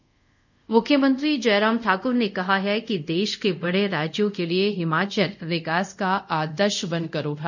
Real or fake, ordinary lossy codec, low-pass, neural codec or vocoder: fake; none; 7.2 kHz; codec, 24 kHz, 0.5 kbps, DualCodec